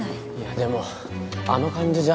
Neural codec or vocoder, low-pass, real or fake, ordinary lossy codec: none; none; real; none